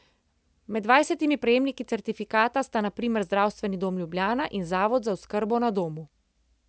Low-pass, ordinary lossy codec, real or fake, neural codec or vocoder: none; none; real; none